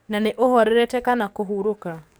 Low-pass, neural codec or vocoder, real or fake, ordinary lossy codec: none; codec, 44.1 kHz, 7.8 kbps, DAC; fake; none